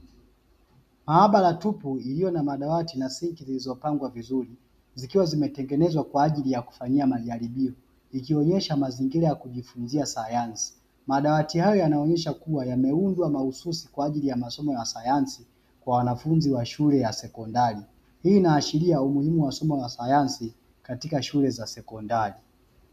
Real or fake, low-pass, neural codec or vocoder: real; 14.4 kHz; none